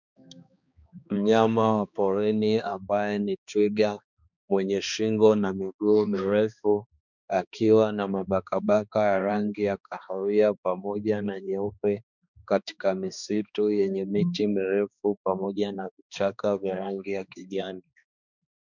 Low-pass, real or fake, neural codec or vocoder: 7.2 kHz; fake; codec, 16 kHz, 2 kbps, X-Codec, HuBERT features, trained on balanced general audio